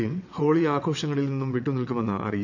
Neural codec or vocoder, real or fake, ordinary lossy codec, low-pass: vocoder, 22.05 kHz, 80 mel bands, WaveNeXt; fake; none; 7.2 kHz